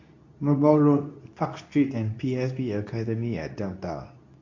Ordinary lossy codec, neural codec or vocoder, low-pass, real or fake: AAC, 48 kbps; codec, 24 kHz, 0.9 kbps, WavTokenizer, medium speech release version 2; 7.2 kHz; fake